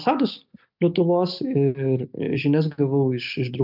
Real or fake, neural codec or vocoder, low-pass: real; none; 5.4 kHz